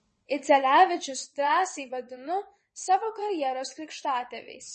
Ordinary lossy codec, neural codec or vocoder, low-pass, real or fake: MP3, 32 kbps; vocoder, 22.05 kHz, 80 mel bands, WaveNeXt; 9.9 kHz; fake